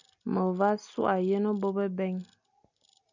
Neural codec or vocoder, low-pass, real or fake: none; 7.2 kHz; real